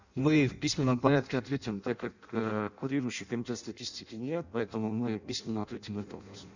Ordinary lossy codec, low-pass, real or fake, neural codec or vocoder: none; 7.2 kHz; fake; codec, 16 kHz in and 24 kHz out, 0.6 kbps, FireRedTTS-2 codec